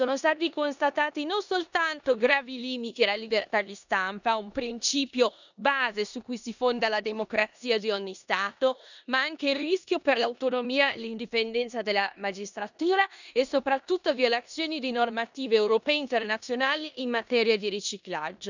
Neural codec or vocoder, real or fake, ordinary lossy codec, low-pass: codec, 16 kHz in and 24 kHz out, 0.9 kbps, LongCat-Audio-Codec, four codebook decoder; fake; none; 7.2 kHz